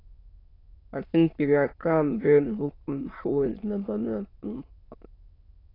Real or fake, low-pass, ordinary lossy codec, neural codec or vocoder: fake; 5.4 kHz; AAC, 24 kbps; autoencoder, 22.05 kHz, a latent of 192 numbers a frame, VITS, trained on many speakers